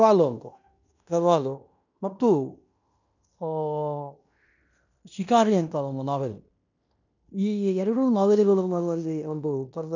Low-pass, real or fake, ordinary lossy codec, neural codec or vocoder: 7.2 kHz; fake; none; codec, 16 kHz in and 24 kHz out, 0.9 kbps, LongCat-Audio-Codec, fine tuned four codebook decoder